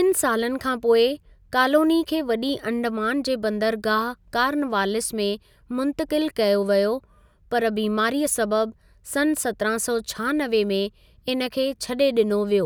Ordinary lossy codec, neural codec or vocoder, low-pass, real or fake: none; none; none; real